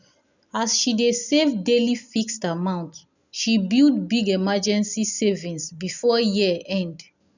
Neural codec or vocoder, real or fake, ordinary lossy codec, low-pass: none; real; none; 7.2 kHz